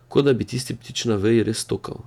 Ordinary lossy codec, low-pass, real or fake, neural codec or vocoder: none; 19.8 kHz; fake; vocoder, 48 kHz, 128 mel bands, Vocos